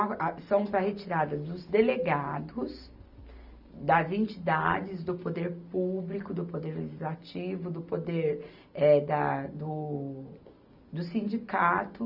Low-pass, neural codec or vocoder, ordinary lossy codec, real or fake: 5.4 kHz; none; none; real